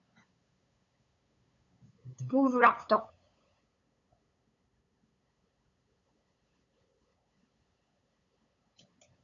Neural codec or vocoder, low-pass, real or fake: codec, 16 kHz, 8 kbps, FunCodec, trained on LibriTTS, 25 frames a second; 7.2 kHz; fake